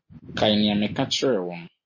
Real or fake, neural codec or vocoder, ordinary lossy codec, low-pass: real; none; MP3, 32 kbps; 7.2 kHz